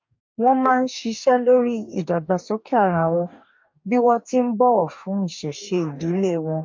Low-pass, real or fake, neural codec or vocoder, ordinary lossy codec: 7.2 kHz; fake; codec, 44.1 kHz, 2.6 kbps, DAC; MP3, 48 kbps